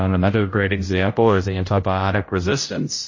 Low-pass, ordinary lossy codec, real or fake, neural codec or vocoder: 7.2 kHz; MP3, 32 kbps; fake; codec, 16 kHz, 0.5 kbps, X-Codec, HuBERT features, trained on general audio